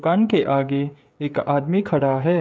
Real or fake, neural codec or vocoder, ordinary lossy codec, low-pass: fake; codec, 16 kHz, 16 kbps, FreqCodec, smaller model; none; none